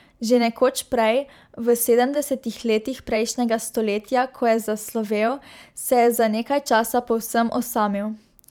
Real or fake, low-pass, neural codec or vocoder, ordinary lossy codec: fake; 19.8 kHz; vocoder, 44.1 kHz, 128 mel bands every 512 samples, BigVGAN v2; none